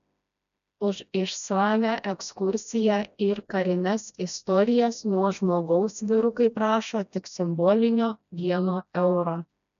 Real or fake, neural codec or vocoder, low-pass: fake; codec, 16 kHz, 1 kbps, FreqCodec, smaller model; 7.2 kHz